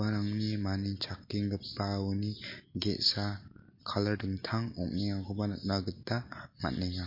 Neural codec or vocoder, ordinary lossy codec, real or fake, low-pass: none; MP3, 32 kbps; real; 5.4 kHz